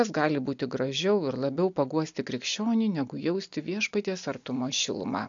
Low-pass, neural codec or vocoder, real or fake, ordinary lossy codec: 7.2 kHz; none; real; AAC, 64 kbps